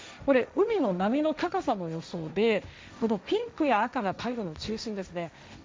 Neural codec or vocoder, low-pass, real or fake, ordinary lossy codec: codec, 16 kHz, 1.1 kbps, Voila-Tokenizer; none; fake; none